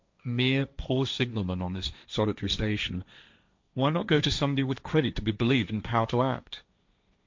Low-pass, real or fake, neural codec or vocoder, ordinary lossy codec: 7.2 kHz; fake; codec, 16 kHz, 1.1 kbps, Voila-Tokenizer; MP3, 64 kbps